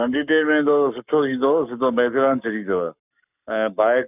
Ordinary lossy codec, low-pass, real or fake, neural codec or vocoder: none; 3.6 kHz; fake; codec, 44.1 kHz, 7.8 kbps, Pupu-Codec